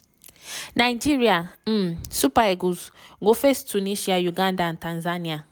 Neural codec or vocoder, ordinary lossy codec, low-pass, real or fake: none; none; none; real